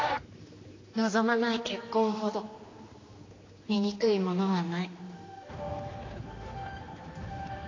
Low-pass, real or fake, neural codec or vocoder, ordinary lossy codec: 7.2 kHz; fake; codec, 16 kHz, 2 kbps, X-Codec, HuBERT features, trained on general audio; AAC, 32 kbps